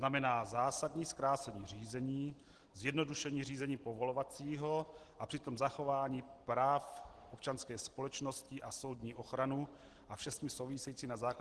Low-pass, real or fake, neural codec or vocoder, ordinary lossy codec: 10.8 kHz; real; none; Opus, 16 kbps